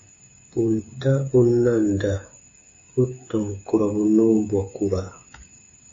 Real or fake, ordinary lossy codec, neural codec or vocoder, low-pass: fake; MP3, 32 kbps; codec, 16 kHz, 8 kbps, FreqCodec, smaller model; 7.2 kHz